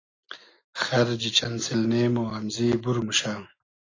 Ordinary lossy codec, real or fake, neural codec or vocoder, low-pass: AAC, 32 kbps; real; none; 7.2 kHz